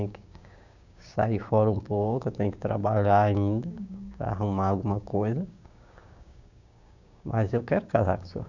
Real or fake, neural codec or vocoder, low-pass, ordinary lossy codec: fake; codec, 16 kHz, 6 kbps, DAC; 7.2 kHz; none